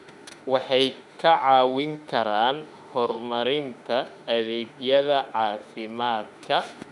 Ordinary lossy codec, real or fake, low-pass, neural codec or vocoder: none; fake; 10.8 kHz; autoencoder, 48 kHz, 32 numbers a frame, DAC-VAE, trained on Japanese speech